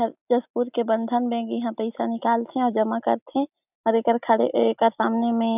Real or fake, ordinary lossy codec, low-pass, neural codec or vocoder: real; none; 3.6 kHz; none